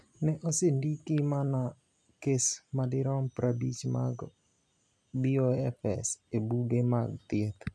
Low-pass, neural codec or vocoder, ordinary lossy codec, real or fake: none; none; none; real